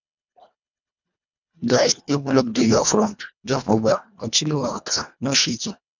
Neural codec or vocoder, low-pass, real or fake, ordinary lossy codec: codec, 24 kHz, 1.5 kbps, HILCodec; 7.2 kHz; fake; none